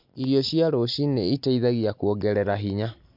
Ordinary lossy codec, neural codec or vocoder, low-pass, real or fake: MP3, 48 kbps; none; 5.4 kHz; real